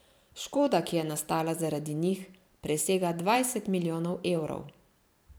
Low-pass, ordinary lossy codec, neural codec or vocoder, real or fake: none; none; vocoder, 44.1 kHz, 128 mel bands every 512 samples, BigVGAN v2; fake